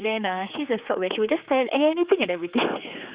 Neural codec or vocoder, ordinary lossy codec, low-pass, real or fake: codec, 16 kHz, 4 kbps, X-Codec, HuBERT features, trained on general audio; Opus, 64 kbps; 3.6 kHz; fake